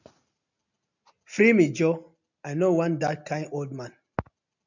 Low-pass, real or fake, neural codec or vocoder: 7.2 kHz; real; none